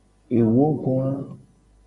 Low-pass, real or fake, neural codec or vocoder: 10.8 kHz; real; none